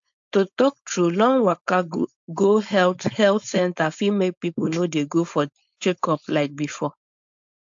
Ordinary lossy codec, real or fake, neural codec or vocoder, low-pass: AAC, 64 kbps; fake; codec, 16 kHz, 4.8 kbps, FACodec; 7.2 kHz